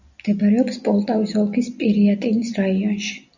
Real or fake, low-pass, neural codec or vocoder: real; 7.2 kHz; none